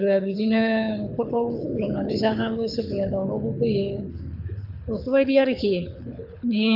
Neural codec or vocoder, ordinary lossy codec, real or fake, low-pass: codec, 24 kHz, 6 kbps, HILCodec; none; fake; 5.4 kHz